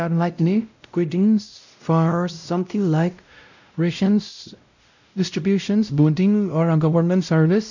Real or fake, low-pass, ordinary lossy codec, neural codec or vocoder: fake; 7.2 kHz; none; codec, 16 kHz, 0.5 kbps, X-Codec, WavLM features, trained on Multilingual LibriSpeech